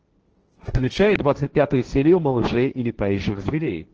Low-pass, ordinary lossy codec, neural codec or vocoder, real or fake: 7.2 kHz; Opus, 16 kbps; codec, 16 kHz, 1.1 kbps, Voila-Tokenizer; fake